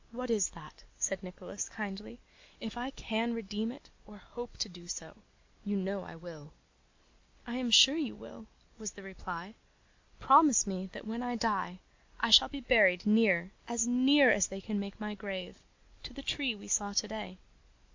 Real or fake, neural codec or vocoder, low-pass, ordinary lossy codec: real; none; 7.2 kHz; MP3, 64 kbps